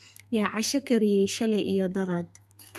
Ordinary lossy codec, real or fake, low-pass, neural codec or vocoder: none; fake; 14.4 kHz; codec, 32 kHz, 1.9 kbps, SNAC